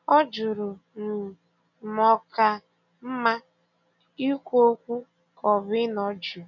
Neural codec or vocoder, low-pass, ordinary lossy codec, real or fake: none; 7.2 kHz; none; real